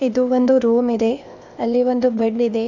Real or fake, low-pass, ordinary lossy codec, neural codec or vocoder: fake; 7.2 kHz; none; codec, 16 kHz, 0.8 kbps, ZipCodec